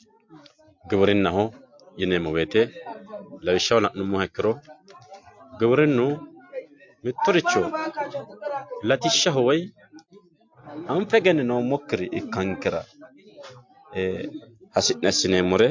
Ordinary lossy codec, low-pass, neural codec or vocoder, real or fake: MP3, 48 kbps; 7.2 kHz; none; real